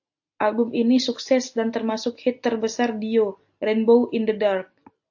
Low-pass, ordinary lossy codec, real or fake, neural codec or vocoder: 7.2 kHz; AAC, 48 kbps; real; none